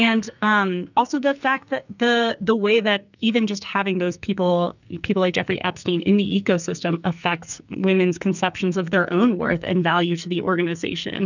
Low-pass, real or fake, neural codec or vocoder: 7.2 kHz; fake; codec, 44.1 kHz, 2.6 kbps, SNAC